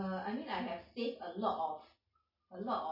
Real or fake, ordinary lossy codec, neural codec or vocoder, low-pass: real; MP3, 24 kbps; none; 5.4 kHz